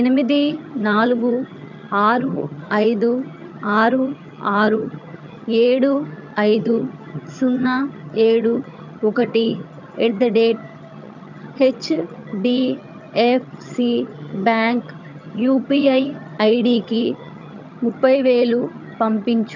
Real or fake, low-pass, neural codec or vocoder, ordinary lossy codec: fake; 7.2 kHz; vocoder, 22.05 kHz, 80 mel bands, HiFi-GAN; none